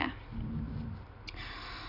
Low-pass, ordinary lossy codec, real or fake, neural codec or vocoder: 5.4 kHz; AAC, 24 kbps; real; none